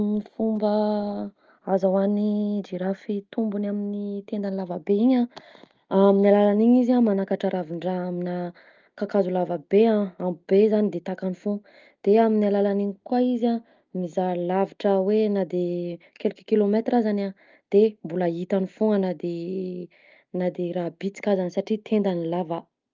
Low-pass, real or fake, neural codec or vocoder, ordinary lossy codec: 7.2 kHz; real; none; Opus, 24 kbps